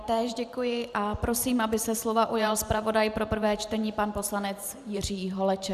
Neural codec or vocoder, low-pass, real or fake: vocoder, 44.1 kHz, 128 mel bands every 512 samples, BigVGAN v2; 14.4 kHz; fake